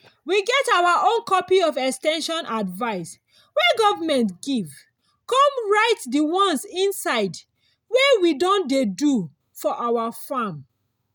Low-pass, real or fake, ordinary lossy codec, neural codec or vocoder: none; real; none; none